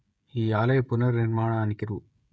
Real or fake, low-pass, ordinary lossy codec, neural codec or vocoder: fake; none; none; codec, 16 kHz, 16 kbps, FreqCodec, smaller model